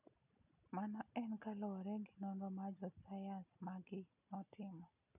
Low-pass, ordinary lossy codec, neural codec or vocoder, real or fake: 3.6 kHz; none; none; real